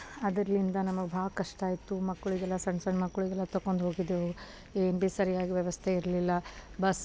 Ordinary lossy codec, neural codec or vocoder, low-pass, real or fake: none; none; none; real